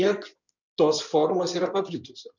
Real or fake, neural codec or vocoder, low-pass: fake; codec, 16 kHz in and 24 kHz out, 2.2 kbps, FireRedTTS-2 codec; 7.2 kHz